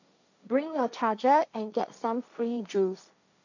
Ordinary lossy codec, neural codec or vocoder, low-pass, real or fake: none; codec, 16 kHz, 1.1 kbps, Voila-Tokenizer; 7.2 kHz; fake